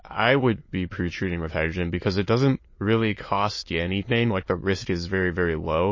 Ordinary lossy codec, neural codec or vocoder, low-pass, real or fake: MP3, 32 kbps; autoencoder, 22.05 kHz, a latent of 192 numbers a frame, VITS, trained on many speakers; 7.2 kHz; fake